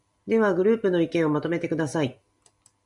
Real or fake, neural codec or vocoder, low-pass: real; none; 10.8 kHz